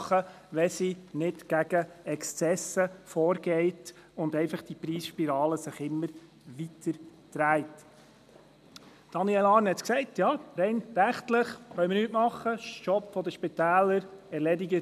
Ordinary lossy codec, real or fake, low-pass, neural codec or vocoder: none; real; 14.4 kHz; none